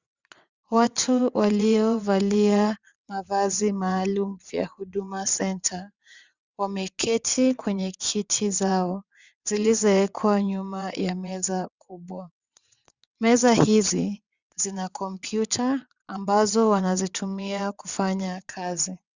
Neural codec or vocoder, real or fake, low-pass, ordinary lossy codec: vocoder, 22.05 kHz, 80 mel bands, WaveNeXt; fake; 7.2 kHz; Opus, 64 kbps